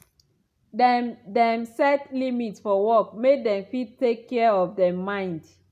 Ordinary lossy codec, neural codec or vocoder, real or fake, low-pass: none; none; real; 14.4 kHz